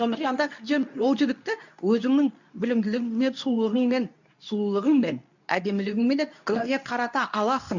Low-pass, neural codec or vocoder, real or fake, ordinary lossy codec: 7.2 kHz; codec, 24 kHz, 0.9 kbps, WavTokenizer, medium speech release version 2; fake; none